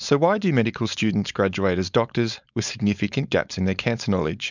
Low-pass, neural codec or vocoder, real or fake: 7.2 kHz; codec, 16 kHz, 4.8 kbps, FACodec; fake